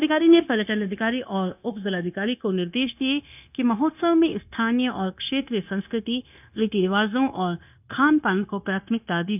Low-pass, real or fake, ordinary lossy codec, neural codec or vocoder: 3.6 kHz; fake; none; codec, 16 kHz, 0.9 kbps, LongCat-Audio-Codec